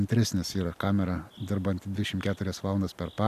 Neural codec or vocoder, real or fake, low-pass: none; real; 14.4 kHz